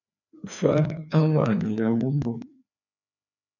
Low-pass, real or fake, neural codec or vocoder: 7.2 kHz; fake; codec, 16 kHz, 2 kbps, FreqCodec, larger model